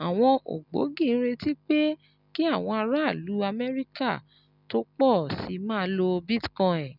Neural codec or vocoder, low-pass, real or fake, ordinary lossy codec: none; 5.4 kHz; real; none